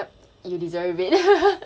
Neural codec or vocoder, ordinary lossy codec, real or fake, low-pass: none; none; real; none